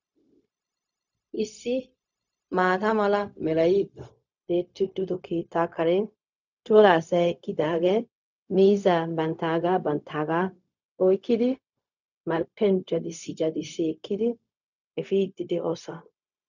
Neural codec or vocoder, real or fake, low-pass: codec, 16 kHz, 0.4 kbps, LongCat-Audio-Codec; fake; 7.2 kHz